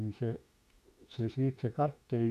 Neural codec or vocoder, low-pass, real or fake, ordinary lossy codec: autoencoder, 48 kHz, 32 numbers a frame, DAC-VAE, trained on Japanese speech; 14.4 kHz; fake; none